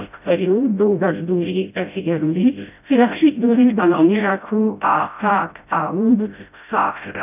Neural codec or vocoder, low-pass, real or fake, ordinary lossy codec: codec, 16 kHz, 0.5 kbps, FreqCodec, smaller model; 3.6 kHz; fake; none